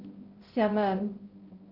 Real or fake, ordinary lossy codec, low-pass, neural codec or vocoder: fake; Opus, 32 kbps; 5.4 kHz; codec, 16 kHz, 0.5 kbps, X-Codec, HuBERT features, trained on balanced general audio